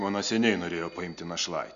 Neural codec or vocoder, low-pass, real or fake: none; 7.2 kHz; real